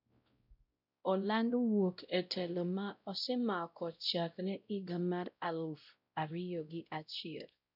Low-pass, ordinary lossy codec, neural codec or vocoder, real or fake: 5.4 kHz; none; codec, 16 kHz, 0.5 kbps, X-Codec, WavLM features, trained on Multilingual LibriSpeech; fake